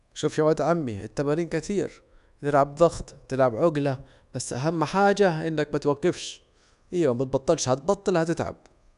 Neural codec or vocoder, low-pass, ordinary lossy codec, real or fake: codec, 24 kHz, 1.2 kbps, DualCodec; 10.8 kHz; none; fake